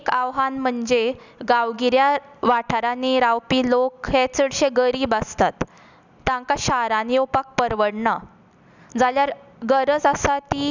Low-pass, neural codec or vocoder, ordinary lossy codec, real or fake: 7.2 kHz; none; none; real